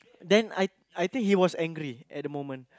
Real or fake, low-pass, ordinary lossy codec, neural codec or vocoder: real; none; none; none